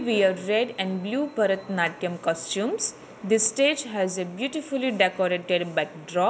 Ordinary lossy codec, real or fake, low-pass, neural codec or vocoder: none; real; none; none